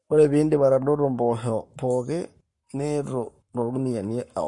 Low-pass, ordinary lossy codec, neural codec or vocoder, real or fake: 10.8 kHz; MP3, 48 kbps; codec, 44.1 kHz, 7.8 kbps, Pupu-Codec; fake